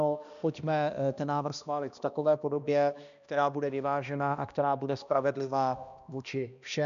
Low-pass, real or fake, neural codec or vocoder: 7.2 kHz; fake; codec, 16 kHz, 1 kbps, X-Codec, HuBERT features, trained on balanced general audio